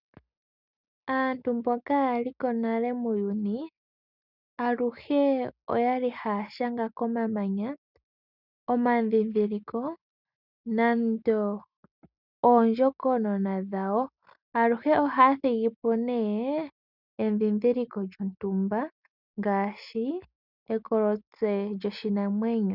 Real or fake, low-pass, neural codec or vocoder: real; 5.4 kHz; none